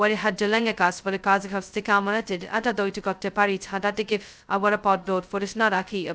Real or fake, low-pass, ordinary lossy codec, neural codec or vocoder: fake; none; none; codec, 16 kHz, 0.2 kbps, FocalCodec